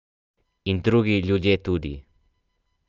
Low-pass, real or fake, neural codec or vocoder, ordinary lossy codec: 7.2 kHz; real; none; Opus, 24 kbps